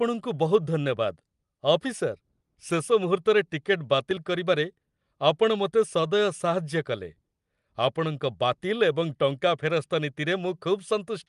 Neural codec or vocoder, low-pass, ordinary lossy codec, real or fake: none; 10.8 kHz; Opus, 32 kbps; real